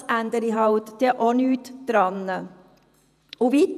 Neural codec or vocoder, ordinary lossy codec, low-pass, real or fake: vocoder, 48 kHz, 128 mel bands, Vocos; none; 14.4 kHz; fake